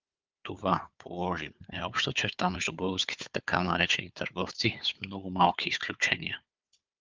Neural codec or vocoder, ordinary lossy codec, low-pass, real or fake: codec, 16 kHz, 4 kbps, FunCodec, trained on Chinese and English, 50 frames a second; Opus, 32 kbps; 7.2 kHz; fake